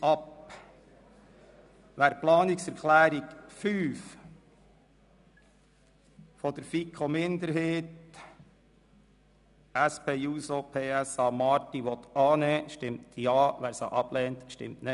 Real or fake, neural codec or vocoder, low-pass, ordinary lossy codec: real; none; 10.8 kHz; none